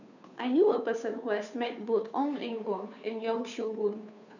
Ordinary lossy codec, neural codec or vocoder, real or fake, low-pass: none; codec, 16 kHz, 2 kbps, FunCodec, trained on Chinese and English, 25 frames a second; fake; 7.2 kHz